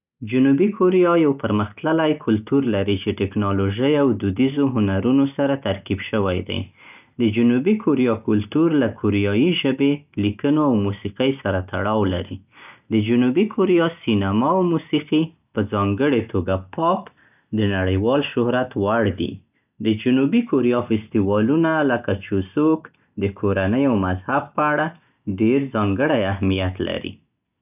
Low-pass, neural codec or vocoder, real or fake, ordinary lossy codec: 3.6 kHz; none; real; none